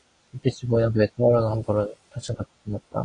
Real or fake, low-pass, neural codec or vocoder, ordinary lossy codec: real; 9.9 kHz; none; AAC, 32 kbps